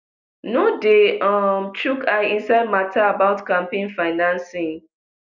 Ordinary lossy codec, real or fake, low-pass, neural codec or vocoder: none; real; 7.2 kHz; none